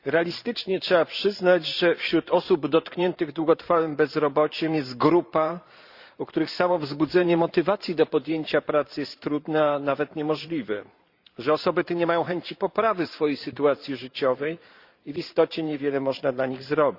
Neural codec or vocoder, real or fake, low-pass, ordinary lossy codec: vocoder, 44.1 kHz, 128 mel bands every 512 samples, BigVGAN v2; fake; 5.4 kHz; Opus, 64 kbps